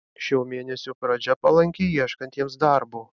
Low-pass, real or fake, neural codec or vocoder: 7.2 kHz; real; none